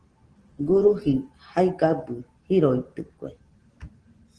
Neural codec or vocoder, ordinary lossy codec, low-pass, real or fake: none; Opus, 16 kbps; 9.9 kHz; real